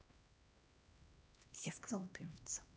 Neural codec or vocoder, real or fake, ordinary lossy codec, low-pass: codec, 16 kHz, 1 kbps, X-Codec, HuBERT features, trained on LibriSpeech; fake; none; none